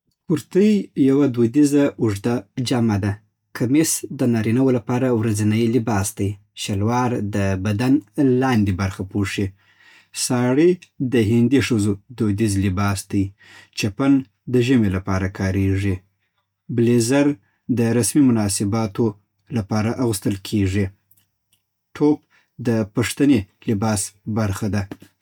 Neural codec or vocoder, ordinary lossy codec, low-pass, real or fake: none; none; 19.8 kHz; real